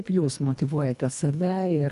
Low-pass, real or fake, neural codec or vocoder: 10.8 kHz; fake; codec, 24 kHz, 1.5 kbps, HILCodec